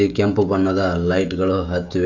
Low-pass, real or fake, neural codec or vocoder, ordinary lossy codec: 7.2 kHz; fake; codec, 16 kHz, 16 kbps, FreqCodec, smaller model; none